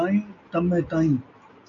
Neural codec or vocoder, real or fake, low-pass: none; real; 7.2 kHz